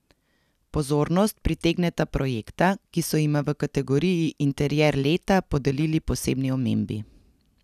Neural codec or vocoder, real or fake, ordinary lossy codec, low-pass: none; real; none; 14.4 kHz